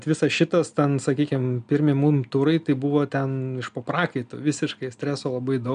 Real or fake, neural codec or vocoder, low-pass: real; none; 9.9 kHz